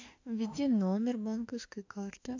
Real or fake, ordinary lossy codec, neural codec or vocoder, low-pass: fake; AAC, 48 kbps; autoencoder, 48 kHz, 32 numbers a frame, DAC-VAE, trained on Japanese speech; 7.2 kHz